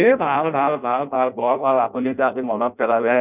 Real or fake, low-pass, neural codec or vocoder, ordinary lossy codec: fake; 3.6 kHz; codec, 16 kHz in and 24 kHz out, 0.6 kbps, FireRedTTS-2 codec; none